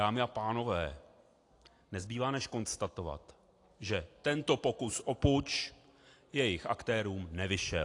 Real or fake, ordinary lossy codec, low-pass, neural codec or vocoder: real; AAC, 48 kbps; 10.8 kHz; none